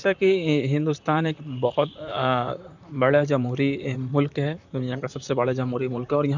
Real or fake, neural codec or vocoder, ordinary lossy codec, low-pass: fake; vocoder, 44.1 kHz, 128 mel bands, Pupu-Vocoder; none; 7.2 kHz